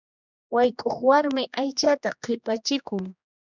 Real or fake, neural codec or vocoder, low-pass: fake; codec, 16 kHz, 2 kbps, X-Codec, HuBERT features, trained on general audio; 7.2 kHz